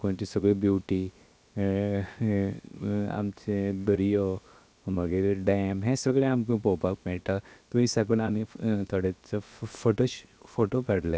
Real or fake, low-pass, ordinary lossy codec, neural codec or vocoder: fake; none; none; codec, 16 kHz, 0.7 kbps, FocalCodec